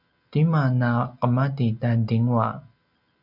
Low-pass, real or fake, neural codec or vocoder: 5.4 kHz; real; none